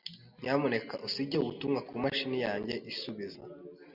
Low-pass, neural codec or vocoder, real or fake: 5.4 kHz; none; real